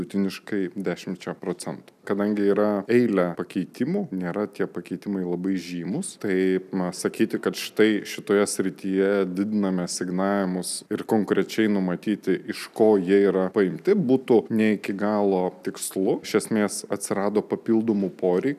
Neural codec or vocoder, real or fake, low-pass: none; real; 14.4 kHz